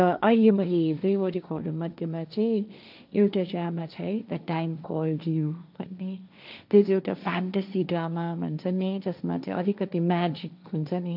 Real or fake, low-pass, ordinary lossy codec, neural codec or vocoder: fake; 5.4 kHz; none; codec, 16 kHz, 1.1 kbps, Voila-Tokenizer